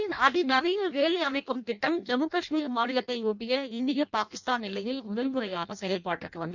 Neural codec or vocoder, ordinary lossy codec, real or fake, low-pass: codec, 16 kHz in and 24 kHz out, 0.6 kbps, FireRedTTS-2 codec; none; fake; 7.2 kHz